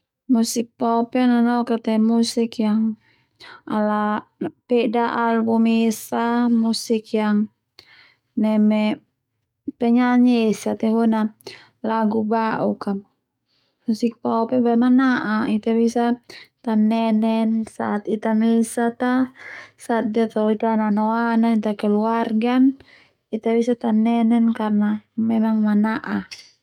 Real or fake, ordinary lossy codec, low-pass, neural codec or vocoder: fake; none; 19.8 kHz; codec, 44.1 kHz, 7.8 kbps, DAC